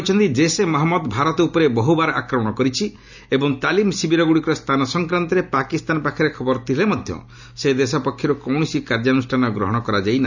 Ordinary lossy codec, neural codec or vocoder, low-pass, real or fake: none; none; 7.2 kHz; real